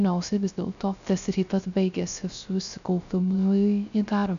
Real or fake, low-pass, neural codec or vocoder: fake; 7.2 kHz; codec, 16 kHz, 0.3 kbps, FocalCodec